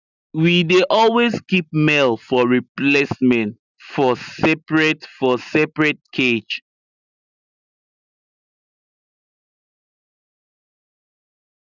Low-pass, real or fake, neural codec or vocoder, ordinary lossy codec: 7.2 kHz; real; none; none